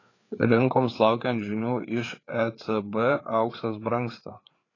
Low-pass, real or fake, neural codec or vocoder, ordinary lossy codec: 7.2 kHz; fake; codec, 16 kHz, 4 kbps, FreqCodec, larger model; AAC, 32 kbps